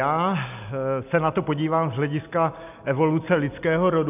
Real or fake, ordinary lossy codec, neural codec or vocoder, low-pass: real; AAC, 32 kbps; none; 3.6 kHz